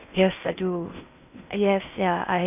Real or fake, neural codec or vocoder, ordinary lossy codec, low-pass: fake; codec, 16 kHz in and 24 kHz out, 0.8 kbps, FocalCodec, streaming, 65536 codes; none; 3.6 kHz